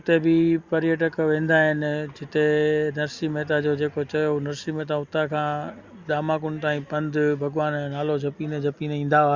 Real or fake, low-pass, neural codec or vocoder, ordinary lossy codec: real; 7.2 kHz; none; Opus, 64 kbps